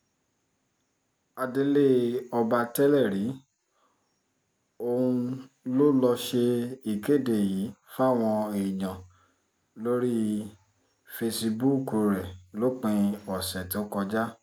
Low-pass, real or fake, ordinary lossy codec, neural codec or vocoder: none; real; none; none